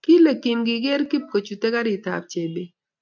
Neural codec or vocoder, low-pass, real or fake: none; 7.2 kHz; real